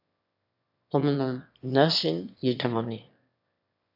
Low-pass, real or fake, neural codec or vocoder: 5.4 kHz; fake; autoencoder, 22.05 kHz, a latent of 192 numbers a frame, VITS, trained on one speaker